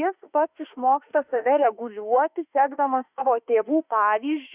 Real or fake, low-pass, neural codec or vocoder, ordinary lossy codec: fake; 3.6 kHz; autoencoder, 48 kHz, 32 numbers a frame, DAC-VAE, trained on Japanese speech; Opus, 64 kbps